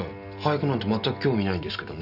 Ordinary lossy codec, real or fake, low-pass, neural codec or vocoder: none; real; 5.4 kHz; none